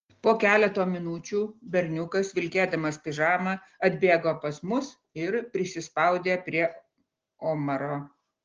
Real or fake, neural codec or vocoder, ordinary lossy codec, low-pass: real; none; Opus, 16 kbps; 7.2 kHz